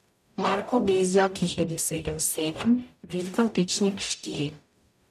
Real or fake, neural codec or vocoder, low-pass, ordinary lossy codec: fake; codec, 44.1 kHz, 0.9 kbps, DAC; 14.4 kHz; none